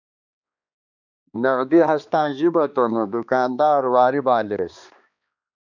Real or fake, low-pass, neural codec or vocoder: fake; 7.2 kHz; codec, 16 kHz, 2 kbps, X-Codec, HuBERT features, trained on balanced general audio